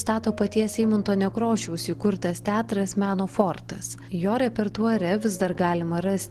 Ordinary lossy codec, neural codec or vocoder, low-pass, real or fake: Opus, 16 kbps; none; 14.4 kHz; real